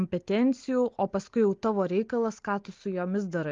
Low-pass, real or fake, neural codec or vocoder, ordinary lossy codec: 7.2 kHz; real; none; Opus, 32 kbps